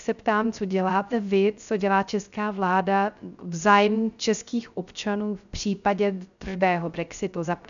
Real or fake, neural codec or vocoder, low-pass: fake; codec, 16 kHz, 0.3 kbps, FocalCodec; 7.2 kHz